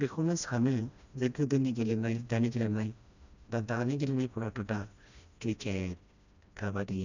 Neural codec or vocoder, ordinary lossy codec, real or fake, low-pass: codec, 16 kHz, 1 kbps, FreqCodec, smaller model; none; fake; 7.2 kHz